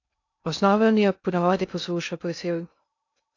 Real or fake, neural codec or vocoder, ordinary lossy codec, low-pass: fake; codec, 16 kHz in and 24 kHz out, 0.6 kbps, FocalCodec, streaming, 2048 codes; AAC, 48 kbps; 7.2 kHz